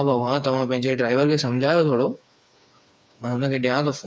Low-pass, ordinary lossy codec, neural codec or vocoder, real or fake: none; none; codec, 16 kHz, 4 kbps, FreqCodec, smaller model; fake